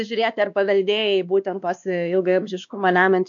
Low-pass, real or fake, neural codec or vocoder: 7.2 kHz; fake; codec, 16 kHz, 2 kbps, X-Codec, WavLM features, trained on Multilingual LibriSpeech